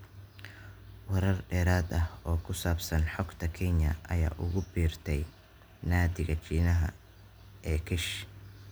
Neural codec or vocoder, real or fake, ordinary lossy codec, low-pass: none; real; none; none